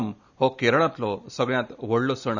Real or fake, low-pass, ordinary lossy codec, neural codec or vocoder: real; 7.2 kHz; none; none